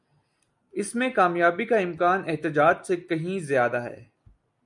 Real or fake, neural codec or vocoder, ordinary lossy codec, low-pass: real; none; MP3, 96 kbps; 10.8 kHz